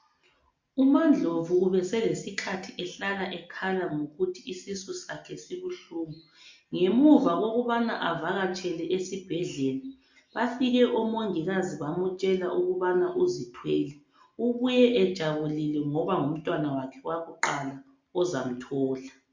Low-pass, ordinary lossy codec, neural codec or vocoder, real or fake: 7.2 kHz; MP3, 48 kbps; none; real